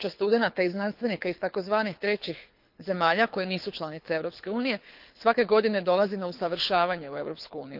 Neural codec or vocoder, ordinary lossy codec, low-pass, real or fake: codec, 24 kHz, 6 kbps, HILCodec; Opus, 32 kbps; 5.4 kHz; fake